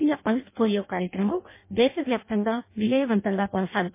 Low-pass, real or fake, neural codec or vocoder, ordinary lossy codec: 3.6 kHz; fake; codec, 16 kHz in and 24 kHz out, 0.6 kbps, FireRedTTS-2 codec; MP3, 24 kbps